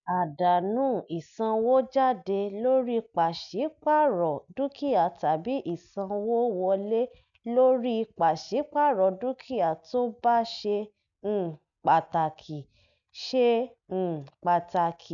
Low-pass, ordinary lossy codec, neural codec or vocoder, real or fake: 7.2 kHz; none; none; real